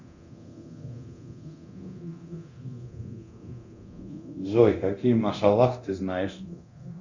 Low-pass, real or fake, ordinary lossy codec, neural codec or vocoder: 7.2 kHz; fake; Opus, 64 kbps; codec, 24 kHz, 0.9 kbps, DualCodec